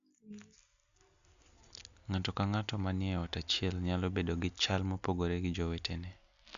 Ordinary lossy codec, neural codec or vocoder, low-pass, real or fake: none; none; 7.2 kHz; real